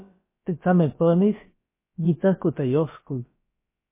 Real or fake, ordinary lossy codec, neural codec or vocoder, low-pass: fake; MP3, 24 kbps; codec, 16 kHz, about 1 kbps, DyCAST, with the encoder's durations; 3.6 kHz